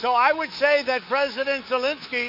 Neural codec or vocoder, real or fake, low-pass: codec, 24 kHz, 3.1 kbps, DualCodec; fake; 5.4 kHz